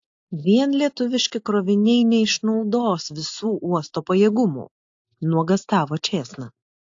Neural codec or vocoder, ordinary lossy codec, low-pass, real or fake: none; AAC, 48 kbps; 7.2 kHz; real